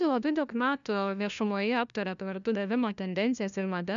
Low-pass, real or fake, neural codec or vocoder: 7.2 kHz; fake; codec, 16 kHz, 1 kbps, FunCodec, trained on LibriTTS, 50 frames a second